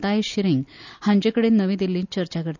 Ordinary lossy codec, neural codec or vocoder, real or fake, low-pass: none; none; real; 7.2 kHz